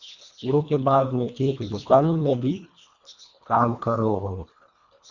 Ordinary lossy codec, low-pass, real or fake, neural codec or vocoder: Opus, 64 kbps; 7.2 kHz; fake; codec, 24 kHz, 1.5 kbps, HILCodec